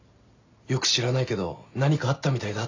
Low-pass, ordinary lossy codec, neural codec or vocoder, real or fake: 7.2 kHz; AAC, 48 kbps; none; real